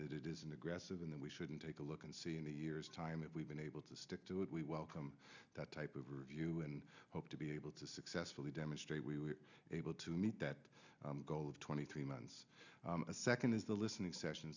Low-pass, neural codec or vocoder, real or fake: 7.2 kHz; none; real